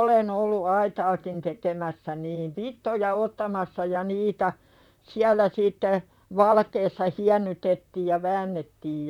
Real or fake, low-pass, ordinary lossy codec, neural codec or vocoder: fake; 19.8 kHz; none; vocoder, 44.1 kHz, 128 mel bands, Pupu-Vocoder